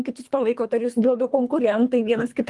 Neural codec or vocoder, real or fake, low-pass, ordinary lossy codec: codec, 24 kHz, 3 kbps, HILCodec; fake; 10.8 kHz; Opus, 24 kbps